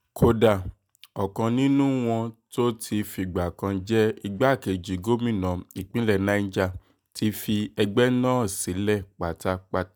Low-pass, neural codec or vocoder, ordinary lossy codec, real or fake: none; none; none; real